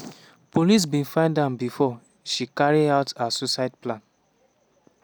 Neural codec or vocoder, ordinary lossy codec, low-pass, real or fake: none; none; none; real